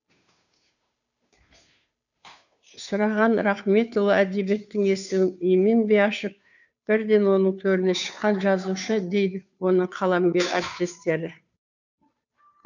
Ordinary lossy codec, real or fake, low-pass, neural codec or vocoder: none; fake; 7.2 kHz; codec, 16 kHz, 2 kbps, FunCodec, trained on Chinese and English, 25 frames a second